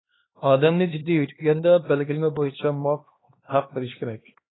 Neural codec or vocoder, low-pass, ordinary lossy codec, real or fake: codec, 16 kHz, 2 kbps, X-Codec, HuBERT features, trained on LibriSpeech; 7.2 kHz; AAC, 16 kbps; fake